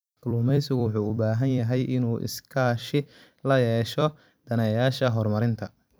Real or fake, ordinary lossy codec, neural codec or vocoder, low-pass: fake; none; vocoder, 44.1 kHz, 128 mel bands every 256 samples, BigVGAN v2; none